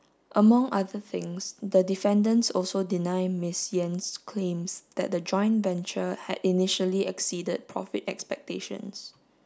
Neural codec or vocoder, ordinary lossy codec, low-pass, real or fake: none; none; none; real